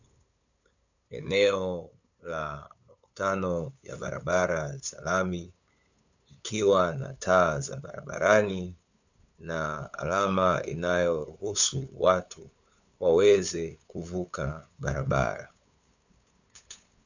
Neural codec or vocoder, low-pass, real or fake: codec, 16 kHz, 8 kbps, FunCodec, trained on LibriTTS, 25 frames a second; 7.2 kHz; fake